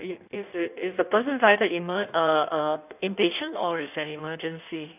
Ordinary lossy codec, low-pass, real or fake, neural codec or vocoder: none; 3.6 kHz; fake; codec, 16 kHz in and 24 kHz out, 1.1 kbps, FireRedTTS-2 codec